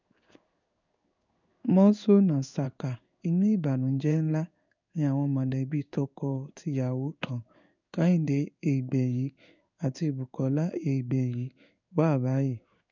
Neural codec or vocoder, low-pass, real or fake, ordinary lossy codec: codec, 16 kHz in and 24 kHz out, 1 kbps, XY-Tokenizer; 7.2 kHz; fake; none